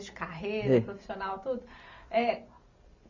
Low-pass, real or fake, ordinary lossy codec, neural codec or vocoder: 7.2 kHz; real; MP3, 48 kbps; none